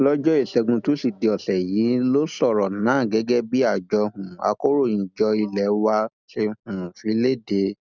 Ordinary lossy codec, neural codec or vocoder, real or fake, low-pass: none; none; real; 7.2 kHz